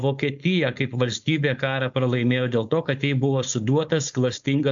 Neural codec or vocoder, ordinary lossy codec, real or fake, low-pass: codec, 16 kHz, 4.8 kbps, FACodec; AAC, 64 kbps; fake; 7.2 kHz